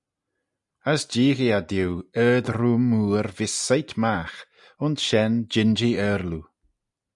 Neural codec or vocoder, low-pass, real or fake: none; 10.8 kHz; real